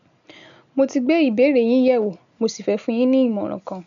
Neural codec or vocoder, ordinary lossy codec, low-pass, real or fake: none; none; 7.2 kHz; real